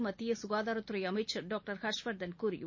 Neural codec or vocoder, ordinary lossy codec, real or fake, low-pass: none; MP3, 32 kbps; real; 7.2 kHz